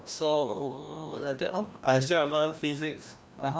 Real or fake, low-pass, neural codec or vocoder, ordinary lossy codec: fake; none; codec, 16 kHz, 1 kbps, FreqCodec, larger model; none